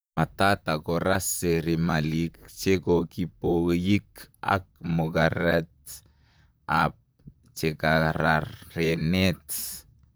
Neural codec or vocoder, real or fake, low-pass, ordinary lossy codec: vocoder, 44.1 kHz, 128 mel bands, Pupu-Vocoder; fake; none; none